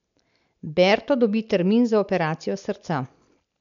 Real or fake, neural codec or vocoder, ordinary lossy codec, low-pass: real; none; none; 7.2 kHz